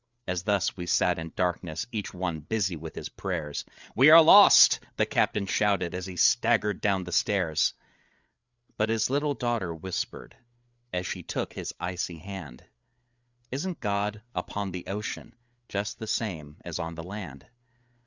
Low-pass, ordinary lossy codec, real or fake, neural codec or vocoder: 7.2 kHz; Opus, 64 kbps; fake; codec, 16 kHz, 8 kbps, FreqCodec, larger model